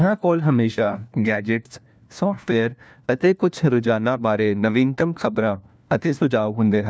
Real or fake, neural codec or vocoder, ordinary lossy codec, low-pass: fake; codec, 16 kHz, 1 kbps, FunCodec, trained on LibriTTS, 50 frames a second; none; none